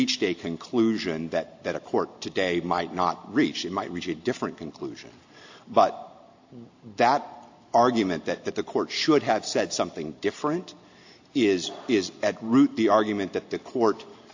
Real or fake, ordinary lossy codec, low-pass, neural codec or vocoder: real; AAC, 48 kbps; 7.2 kHz; none